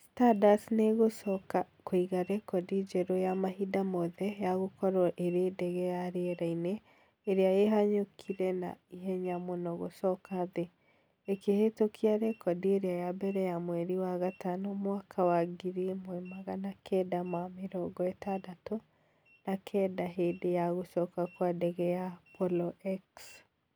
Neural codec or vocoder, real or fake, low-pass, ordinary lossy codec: none; real; none; none